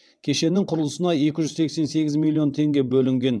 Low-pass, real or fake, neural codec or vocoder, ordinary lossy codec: none; fake; vocoder, 22.05 kHz, 80 mel bands, WaveNeXt; none